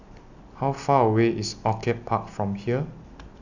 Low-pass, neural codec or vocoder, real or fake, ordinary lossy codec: 7.2 kHz; none; real; none